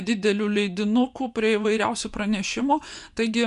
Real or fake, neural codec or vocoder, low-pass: fake; vocoder, 24 kHz, 100 mel bands, Vocos; 10.8 kHz